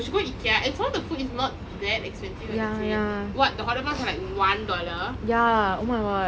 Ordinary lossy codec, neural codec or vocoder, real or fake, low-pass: none; none; real; none